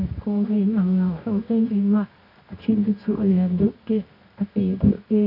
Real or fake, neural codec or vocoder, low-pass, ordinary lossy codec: fake; codec, 24 kHz, 0.9 kbps, WavTokenizer, medium music audio release; 5.4 kHz; none